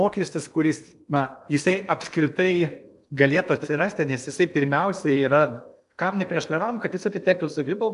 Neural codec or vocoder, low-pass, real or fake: codec, 16 kHz in and 24 kHz out, 0.8 kbps, FocalCodec, streaming, 65536 codes; 10.8 kHz; fake